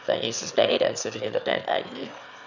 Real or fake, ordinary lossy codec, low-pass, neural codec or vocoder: fake; none; 7.2 kHz; autoencoder, 22.05 kHz, a latent of 192 numbers a frame, VITS, trained on one speaker